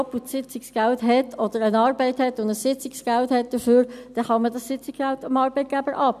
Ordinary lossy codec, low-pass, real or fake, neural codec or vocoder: none; 14.4 kHz; real; none